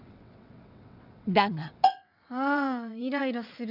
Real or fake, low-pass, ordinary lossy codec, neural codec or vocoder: fake; 5.4 kHz; none; vocoder, 22.05 kHz, 80 mel bands, WaveNeXt